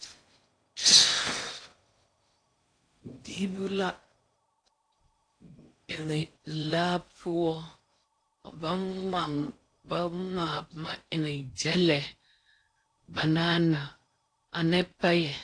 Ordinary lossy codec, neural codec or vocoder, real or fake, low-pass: AAC, 48 kbps; codec, 16 kHz in and 24 kHz out, 0.6 kbps, FocalCodec, streaming, 4096 codes; fake; 9.9 kHz